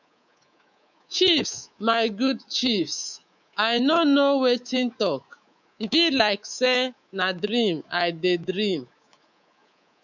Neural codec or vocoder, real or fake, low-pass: codec, 24 kHz, 3.1 kbps, DualCodec; fake; 7.2 kHz